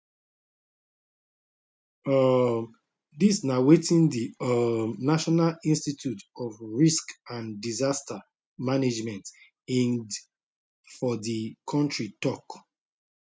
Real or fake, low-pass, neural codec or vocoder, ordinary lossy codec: real; none; none; none